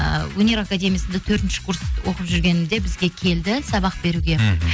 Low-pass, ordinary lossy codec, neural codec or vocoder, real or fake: none; none; none; real